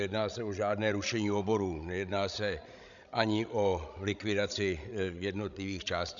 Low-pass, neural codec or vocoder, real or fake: 7.2 kHz; codec, 16 kHz, 16 kbps, FreqCodec, larger model; fake